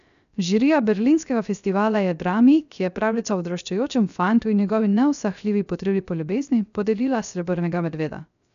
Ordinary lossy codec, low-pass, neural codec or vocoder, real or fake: none; 7.2 kHz; codec, 16 kHz, 0.3 kbps, FocalCodec; fake